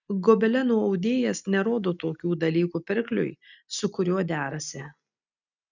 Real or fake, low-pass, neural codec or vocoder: real; 7.2 kHz; none